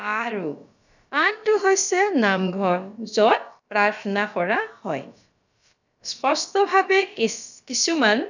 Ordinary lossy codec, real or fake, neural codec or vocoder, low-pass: none; fake; codec, 16 kHz, about 1 kbps, DyCAST, with the encoder's durations; 7.2 kHz